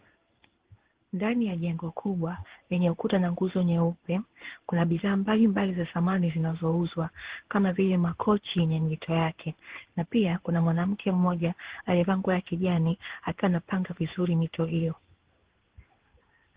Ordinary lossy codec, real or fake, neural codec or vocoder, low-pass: Opus, 16 kbps; fake; codec, 16 kHz in and 24 kHz out, 1 kbps, XY-Tokenizer; 3.6 kHz